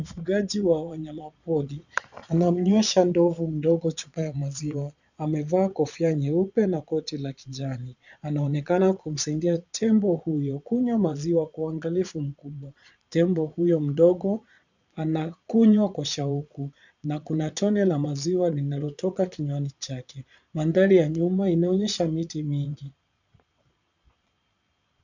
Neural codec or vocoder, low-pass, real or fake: vocoder, 22.05 kHz, 80 mel bands, Vocos; 7.2 kHz; fake